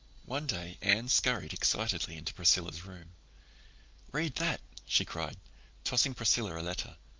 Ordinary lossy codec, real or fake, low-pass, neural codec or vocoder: Opus, 32 kbps; real; 7.2 kHz; none